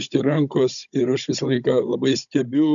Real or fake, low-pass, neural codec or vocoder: fake; 7.2 kHz; codec, 16 kHz, 16 kbps, FunCodec, trained on Chinese and English, 50 frames a second